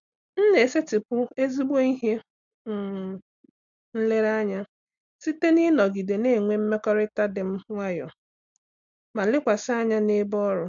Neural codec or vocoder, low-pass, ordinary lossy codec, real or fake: none; 7.2 kHz; MP3, 64 kbps; real